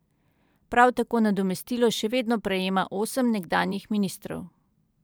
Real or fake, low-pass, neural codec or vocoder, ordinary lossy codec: fake; none; vocoder, 44.1 kHz, 128 mel bands every 512 samples, BigVGAN v2; none